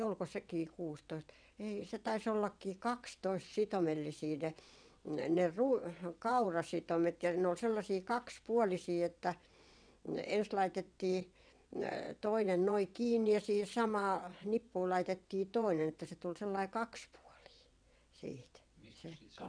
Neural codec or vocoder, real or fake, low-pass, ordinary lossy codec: vocoder, 22.05 kHz, 80 mel bands, WaveNeXt; fake; 9.9 kHz; none